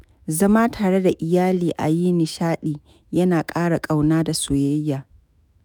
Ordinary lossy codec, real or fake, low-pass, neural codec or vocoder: none; fake; none; autoencoder, 48 kHz, 128 numbers a frame, DAC-VAE, trained on Japanese speech